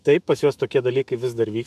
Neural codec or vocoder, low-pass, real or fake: autoencoder, 48 kHz, 128 numbers a frame, DAC-VAE, trained on Japanese speech; 14.4 kHz; fake